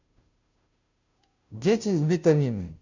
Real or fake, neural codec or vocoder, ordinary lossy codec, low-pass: fake; codec, 16 kHz, 0.5 kbps, FunCodec, trained on Chinese and English, 25 frames a second; none; 7.2 kHz